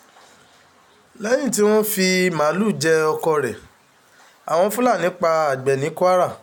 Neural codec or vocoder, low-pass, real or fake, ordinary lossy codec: none; none; real; none